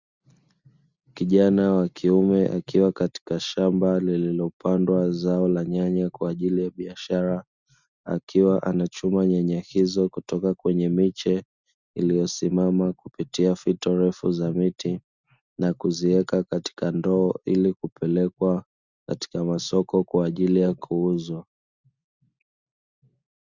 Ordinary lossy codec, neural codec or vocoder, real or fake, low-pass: Opus, 64 kbps; none; real; 7.2 kHz